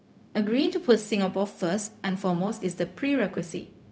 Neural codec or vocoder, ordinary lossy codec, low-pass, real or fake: codec, 16 kHz, 0.4 kbps, LongCat-Audio-Codec; none; none; fake